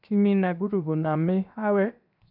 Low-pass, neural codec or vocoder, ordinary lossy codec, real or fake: 5.4 kHz; codec, 16 kHz, 0.7 kbps, FocalCodec; none; fake